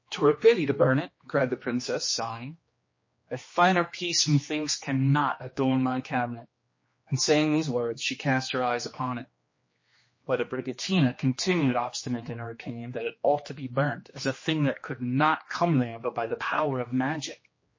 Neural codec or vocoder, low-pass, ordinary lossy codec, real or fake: codec, 16 kHz, 2 kbps, X-Codec, HuBERT features, trained on general audio; 7.2 kHz; MP3, 32 kbps; fake